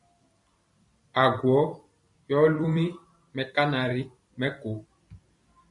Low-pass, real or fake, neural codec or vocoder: 10.8 kHz; fake; vocoder, 44.1 kHz, 128 mel bands every 256 samples, BigVGAN v2